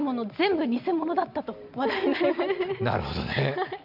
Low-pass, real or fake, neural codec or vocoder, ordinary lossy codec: 5.4 kHz; real; none; none